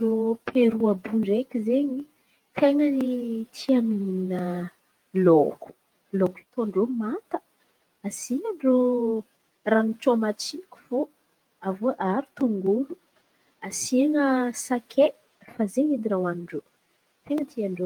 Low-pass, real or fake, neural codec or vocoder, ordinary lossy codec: 19.8 kHz; fake; vocoder, 48 kHz, 128 mel bands, Vocos; Opus, 32 kbps